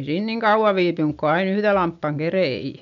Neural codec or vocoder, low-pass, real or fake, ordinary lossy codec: none; 7.2 kHz; real; none